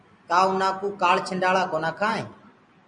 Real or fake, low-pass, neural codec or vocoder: real; 9.9 kHz; none